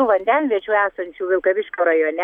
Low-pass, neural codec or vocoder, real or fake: 19.8 kHz; none; real